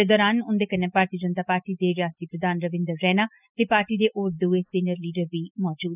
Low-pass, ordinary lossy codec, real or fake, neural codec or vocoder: 3.6 kHz; none; real; none